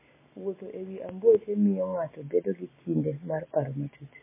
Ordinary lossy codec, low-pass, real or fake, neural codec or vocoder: MP3, 16 kbps; 3.6 kHz; real; none